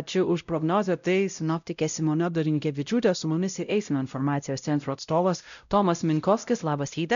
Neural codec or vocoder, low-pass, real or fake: codec, 16 kHz, 0.5 kbps, X-Codec, WavLM features, trained on Multilingual LibriSpeech; 7.2 kHz; fake